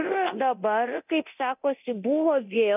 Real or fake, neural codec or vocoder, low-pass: fake; codec, 24 kHz, 0.9 kbps, DualCodec; 3.6 kHz